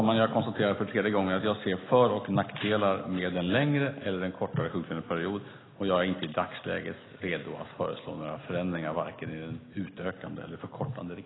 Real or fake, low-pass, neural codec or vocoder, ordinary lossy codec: real; 7.2 kHz; none; AAC, 16 kbps